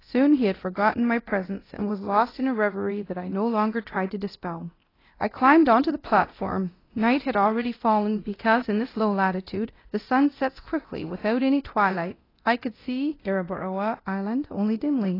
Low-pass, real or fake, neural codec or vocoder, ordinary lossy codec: 5.4 kHz; fake; codec, 24 kHz, 0.9 kbps, DualCodec; AAC, 24 kbps